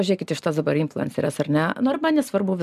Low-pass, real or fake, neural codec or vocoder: 14.4 kHz; real; none